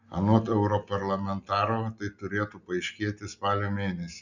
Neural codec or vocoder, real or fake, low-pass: none; real; 7.2 kHz